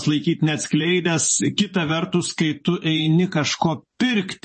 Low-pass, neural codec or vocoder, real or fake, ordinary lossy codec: 10.8 kHz; vocoder, 24 kHz, 100 mel bands, Vocos; fake; MP3, 32 kbps